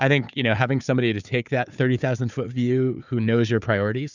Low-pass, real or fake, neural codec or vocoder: 7.2 kHz; fake; codec, 16 kHz, 4 kbps, FunCodec, trained on Chinese and English, 50 frames a second